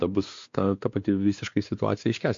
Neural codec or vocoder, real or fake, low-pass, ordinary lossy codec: codec, 16 kHz, 2 kbps, X-Codec, WavLM features, trained on Multilingual LibriSpeech; fake; 7.2 kHz; MP3, 48 kbps